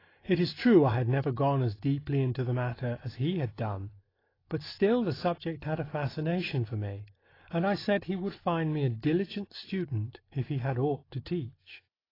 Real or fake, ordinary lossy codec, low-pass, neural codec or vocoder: real; AAC, 24 kbps; 5.4 kHz; none